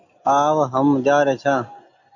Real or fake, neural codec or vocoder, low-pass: real; none; 7.2 kHz